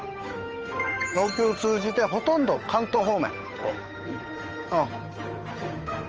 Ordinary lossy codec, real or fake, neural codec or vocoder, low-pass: Opus, 24 kbps; fake; codec, 16 kHz, 8 kbps, FunCodec, trained on Chinese and English, 25 frames a second; 7.2 kHz